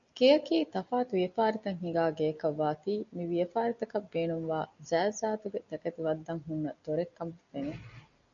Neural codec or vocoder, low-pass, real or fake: none; 7.2 kHz; real